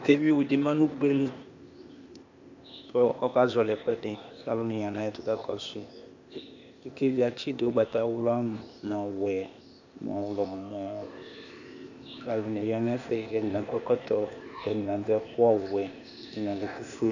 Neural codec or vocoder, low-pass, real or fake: codec, 16 kHz, 0.8 kbps, ZipCodec; 7.2 kHz; fake